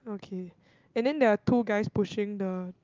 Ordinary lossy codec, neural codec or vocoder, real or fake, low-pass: Opus, 32 kbps; none; real; 7.2 kHz